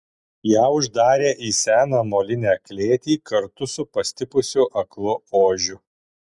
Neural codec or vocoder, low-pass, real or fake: none; 10.8 kHz; real